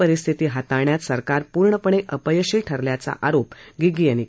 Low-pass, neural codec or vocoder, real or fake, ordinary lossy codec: none; none; real; none